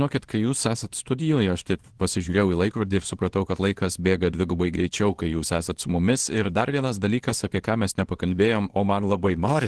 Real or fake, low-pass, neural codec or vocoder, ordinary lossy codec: fake; 10.8 kHz; codec, 24 kHz, 0.9 kbps, WavTokenizer, small release; Opus, 16 kbps